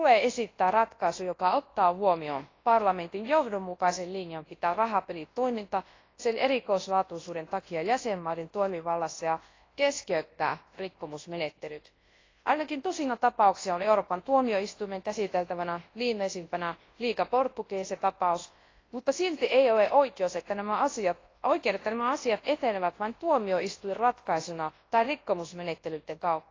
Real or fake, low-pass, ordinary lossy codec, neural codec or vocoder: fake; 7.2 kHz; AAC, 32 kbps; codec, 24 kHz, 0.9 kbps, WavTokenizer, large speech release